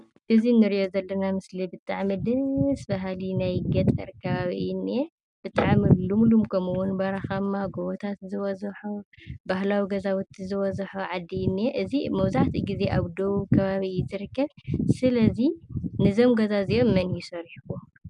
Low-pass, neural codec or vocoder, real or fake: 10.8 kHz; none; real